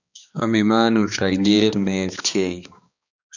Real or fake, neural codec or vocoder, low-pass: fake; codec, 16 kHz, 2 kbps, X-Codec, HuBERT features, trained on balanced general audio; 7.2 kHz